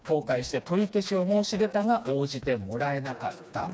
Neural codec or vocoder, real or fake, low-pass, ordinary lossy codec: codec, 16 kHz, 2 kbps, FreqCodec, smaller model; fake; none; none